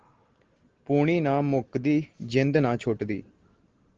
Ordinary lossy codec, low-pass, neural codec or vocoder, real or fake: Opus, 16 kbps; 7.2 kHz; none; real